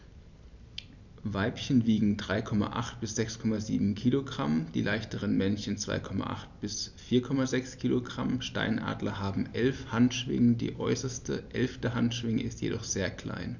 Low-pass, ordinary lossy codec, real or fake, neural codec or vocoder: 7.2 kHz; none; real; none